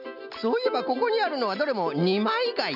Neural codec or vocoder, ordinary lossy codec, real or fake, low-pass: none; none; real; 5.4 kHz